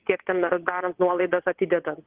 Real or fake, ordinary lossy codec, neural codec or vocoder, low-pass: fake; Opus, 16 kbps; vocoder, 22.05 kHz, 80 mel bands, WaveNeXt; 3.6 kHz